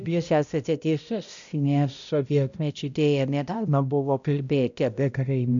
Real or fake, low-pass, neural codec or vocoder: fake; 7.2 kHz; codec, 16 kHz, 0.5 kbps, X-Codec, HuBERT features, trained on balanced general audio